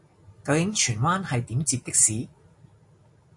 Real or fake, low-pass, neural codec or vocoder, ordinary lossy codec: real; 10.8 kHz; none; MP3, 48 kbps